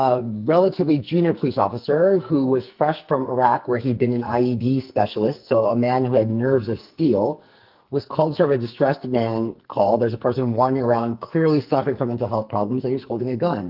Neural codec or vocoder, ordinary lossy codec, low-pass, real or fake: codec, 44.1 kHz, 2.6 kbps, SNAC; Opus, 32 kbps; 5.4 kHz; fake